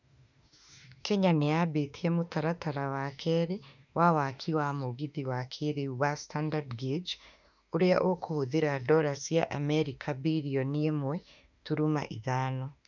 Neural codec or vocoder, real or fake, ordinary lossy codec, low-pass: autoencoder, 48 kHz, 32 numbers a frame, DAC-VAE, trained on Japanese speech; fake; none; 7.2 kHz